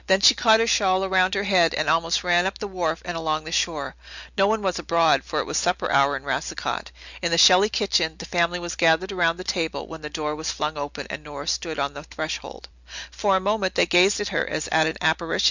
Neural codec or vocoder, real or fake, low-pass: none; real; 7.2 kHz